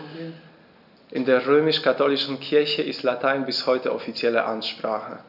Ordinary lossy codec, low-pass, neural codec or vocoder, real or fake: none; 5.4 kHz; none; real